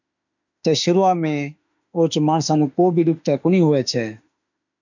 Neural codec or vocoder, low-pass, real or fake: autoencoder, 48 kHz, 32 numbers a frame, DAC-VAE, trained on Japanese speech; 7.2 kHz; fake